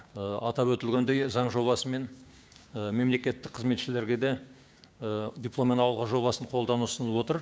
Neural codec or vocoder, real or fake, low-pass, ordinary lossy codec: codec, 16 kHz, 6 kbps, DAC; fake; none; none